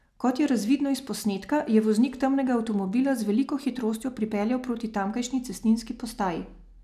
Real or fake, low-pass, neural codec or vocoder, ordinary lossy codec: real; 14.4 kHz; none; none